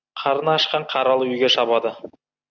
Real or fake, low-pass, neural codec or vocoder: real; 7.2 kHz; none